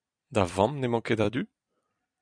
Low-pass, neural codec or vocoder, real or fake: 9.9 kHz; vocoder, 44.1 kHz, 128 mel bands every 256 samples, BigVGAN v2; fake